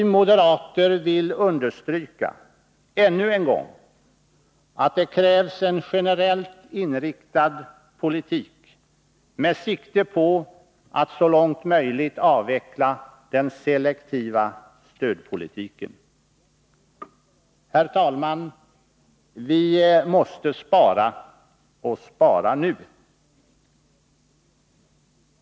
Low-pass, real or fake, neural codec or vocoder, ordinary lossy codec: none; real; none; none